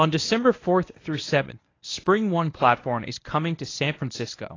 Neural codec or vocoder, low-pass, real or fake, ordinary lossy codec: codec, 24 kHz, 0.9 kbps, WavTokenizer, medium speech release version 1; 7.2 kHz; fake; AAC, 32 kbps